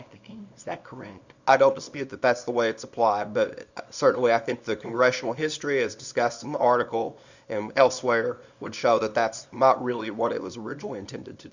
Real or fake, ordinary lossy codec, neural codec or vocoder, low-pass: fake; Opus, 64 kbps; codec, 24 kHz, 0.9 kbps, WavTokenizer, small release; 7.2 kHz